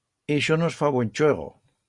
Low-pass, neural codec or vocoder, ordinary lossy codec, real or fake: 10.8 kHz; none; Opus, 64 kbps; real